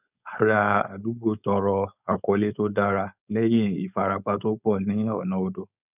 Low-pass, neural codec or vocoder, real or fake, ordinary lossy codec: 3.6 kHz; codec, 16 kHz, 4.8 kbps, FACodec; fake; none